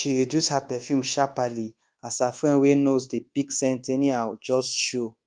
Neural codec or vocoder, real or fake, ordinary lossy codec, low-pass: codec, 24 kHz, 1.2 kbps, DualCodec; fake; Opus, 24 kbps; 9.9 kHz